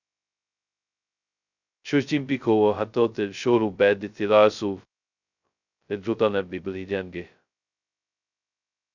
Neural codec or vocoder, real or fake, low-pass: codec, 16 kHz, 0.2 kbps, FocalCodec; fake; 7.2 kHz